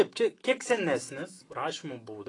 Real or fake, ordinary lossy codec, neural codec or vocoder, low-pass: fake; AAC, 32 kbps; autoencoder, 48 kHz, 128 numbers a frame, DAC-VAE, trained on Japanese speech; 10.8 kHz